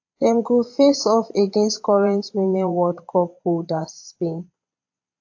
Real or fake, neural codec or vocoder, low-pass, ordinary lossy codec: fake; vocoder, 22.05 kHz, 80 mel bands, Vocos; 7.2 kHz; AAC, 48 kbps